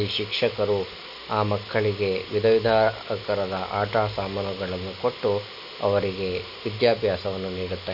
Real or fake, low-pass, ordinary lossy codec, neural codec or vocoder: real; 5.4 kHz; none; none